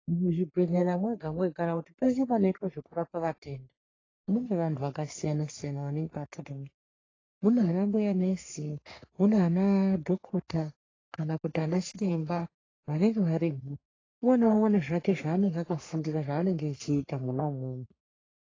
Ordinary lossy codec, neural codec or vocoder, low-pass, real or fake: AAC, 32 kbps; codec, 44.1 kHz, 3.4 kbps, Pupu-Codec; 7.2 kHz; fake